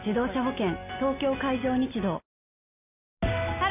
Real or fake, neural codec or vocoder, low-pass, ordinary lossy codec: real; none; 3.6 kHz; none